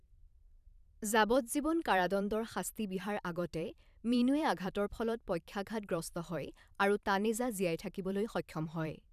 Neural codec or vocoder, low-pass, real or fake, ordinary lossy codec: vocoder, 44.1 kHz, 128 mel bands every 512 samples, BigVGAN v2; 14.4 kHz; fake; none